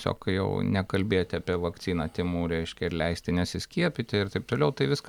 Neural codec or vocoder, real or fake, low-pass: none; real; 19.8 kHz